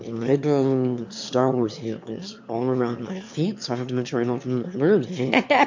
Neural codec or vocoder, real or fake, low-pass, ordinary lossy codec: autoencoder, 22.05 kHz, a latent of 192 numbers a frame, VITS, trained on one speaker; fake; 7.2 kHz; MP3, 48 kbps